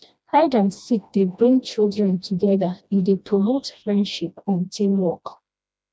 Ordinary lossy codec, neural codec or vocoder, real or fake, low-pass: none; codec, 16 kHz, 1 kbps, FreqCodec, smaller model; fake; none